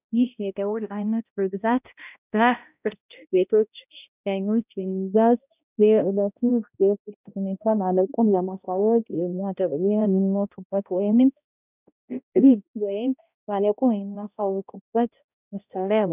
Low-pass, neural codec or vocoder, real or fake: 3.6 kHz; codec, 16 kHz, 0.5 kbps, X-Codec, HuBERT features, trained on balanced general audio; fake